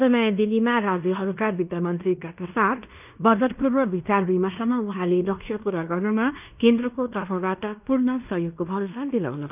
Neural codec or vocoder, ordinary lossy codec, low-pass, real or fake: codec, 16 kHz in and 24 kHz out, 0.9 kbps, LongCat-Audio-Codec, fine tuned four codebook decoder; none; 3.6 kHz; fake